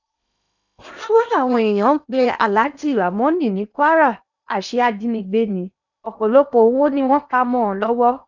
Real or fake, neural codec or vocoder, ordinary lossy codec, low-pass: fake; codec, 16 kHz in and 24 kHz out, 0.8 kbps, FocalCodec, streaming, 65536 codes; none; 7.2 kHz